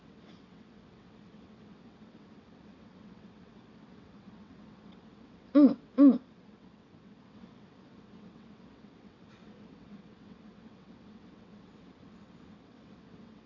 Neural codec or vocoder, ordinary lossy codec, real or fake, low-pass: vocoder, 22.05 kHz, 80 mel bands, WaveNeXt; AAC, 48 kbps; fake; 7.2 kHz